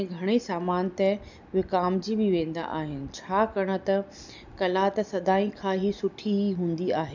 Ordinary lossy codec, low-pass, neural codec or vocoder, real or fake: none; 7.2 kHz; none; real